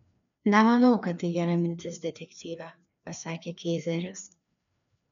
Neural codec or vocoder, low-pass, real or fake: codec, 16 kHz, 2 kbps, FreqCodec, larger model; 7.2 kHz; fake